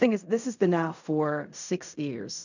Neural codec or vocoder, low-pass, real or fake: codec, 16 kHz in and 24 kHz out, 0.4 kbps, LongCat-Audio-Codec, fine tuned four codebook decoder; 7.2 kHz; fake